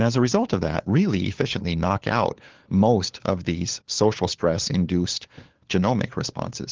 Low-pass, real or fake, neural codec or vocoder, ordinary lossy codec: 7.2 kHz; fake; codec, 16 kHz, 4 kbps, FunCodec, trained on LibriTTS, 50 frames a second; Opus, 16 kbps